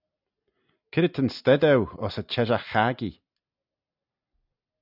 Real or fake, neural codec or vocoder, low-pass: real; none; 5.4 kHz